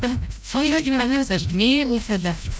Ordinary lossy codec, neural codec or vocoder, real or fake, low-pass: none; codec, 16 kHz, 0.5 kbps, FreqCodec, larger model; fake; none